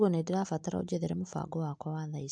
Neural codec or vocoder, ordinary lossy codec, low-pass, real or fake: none; MP3, 64 kbps; 9.9 kHz; real